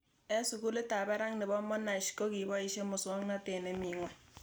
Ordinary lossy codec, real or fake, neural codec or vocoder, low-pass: none; real; none; none